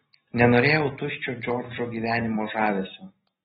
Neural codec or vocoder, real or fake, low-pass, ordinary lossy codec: none; real; 19.8 kHz; AAC, 16 kbps